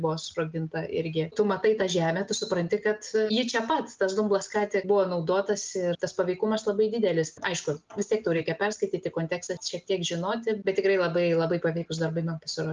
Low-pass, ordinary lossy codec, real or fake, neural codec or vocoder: 7.2 kHz; Opus, 32 kbps; real; none